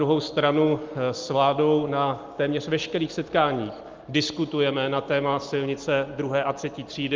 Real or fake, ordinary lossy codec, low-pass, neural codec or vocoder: real; Opus, 32 kbps; 7.2 kHz; none